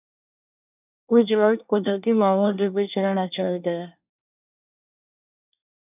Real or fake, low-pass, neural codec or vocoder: fake; 3.6 kHz; codec, 24 kHz, 1 kbps, SNAC